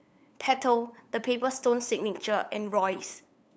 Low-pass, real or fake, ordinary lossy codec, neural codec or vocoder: none; fake; none; codec, 16 kHz, 8 kbps, FunCodec, trained on LibriTTS, 25 frames a second